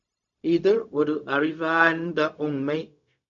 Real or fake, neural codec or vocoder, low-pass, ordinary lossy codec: fake; codec, 16 kHz, 0.4 kbps, LongCat-Audio-Codec; 7.2 kHz; MP3, 64 kbps